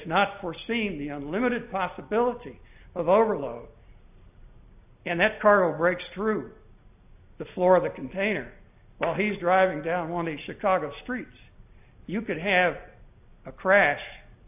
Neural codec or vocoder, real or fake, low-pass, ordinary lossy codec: none; real; 3.6 kHz; AAC, 32 kbps